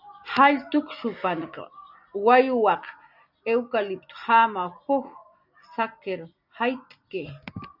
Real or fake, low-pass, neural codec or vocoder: real; 5.4 kHz; none